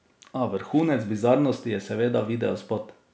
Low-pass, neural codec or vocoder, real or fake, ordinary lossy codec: none; none; real; none